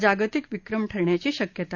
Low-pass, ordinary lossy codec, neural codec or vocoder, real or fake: 7.2 kHz; Opus, 64 kbps; none; real